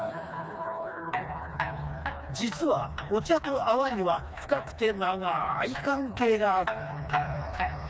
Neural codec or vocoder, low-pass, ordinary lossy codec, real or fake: codec, 16 kHz, 2 kbps, FreqCodec, smaller model; none; none; fake